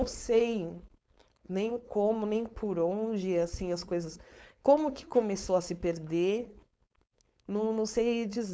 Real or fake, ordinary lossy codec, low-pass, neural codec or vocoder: fake; none; none; codec, 16 kHz, 4.8 kbps, FACodec